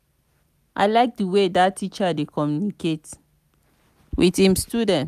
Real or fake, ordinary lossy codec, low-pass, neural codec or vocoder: real; none; 14.4 kHz; none